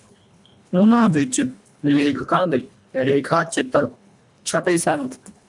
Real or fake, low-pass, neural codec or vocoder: fake; 10.8 kHz; codec, 24 kHz, 1.5 kbps, HILCodec